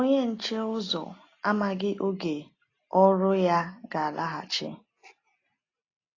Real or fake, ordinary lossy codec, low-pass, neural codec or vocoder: real; AAC, 32 kbps; 7.2 kHz; none